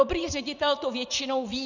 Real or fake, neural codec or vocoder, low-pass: fake; vocoder, 44.1 kHz, 80 mel bands, Vocos; 7.2 kHz